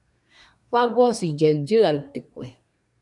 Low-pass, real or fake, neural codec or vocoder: 10.8 kHz; fake; codec, 24 kHz, 1 kbps, SNAC